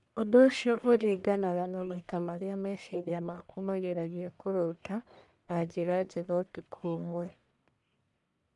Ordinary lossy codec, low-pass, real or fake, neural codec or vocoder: MP3, 96 kbps; 10.8 kHz; fake; codec, 44.1 kHz, 1.7 kbps, Pupu-Codec